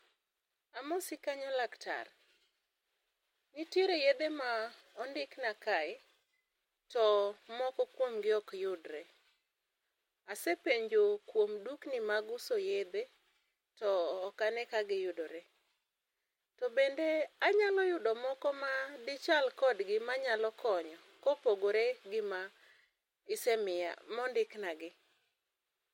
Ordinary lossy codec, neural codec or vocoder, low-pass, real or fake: MP3, 64 kbps; none; 19.8 kHz; real